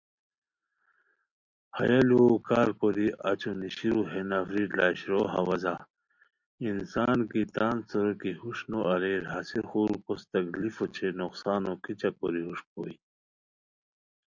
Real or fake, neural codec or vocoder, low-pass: real; none; 7.2 kHz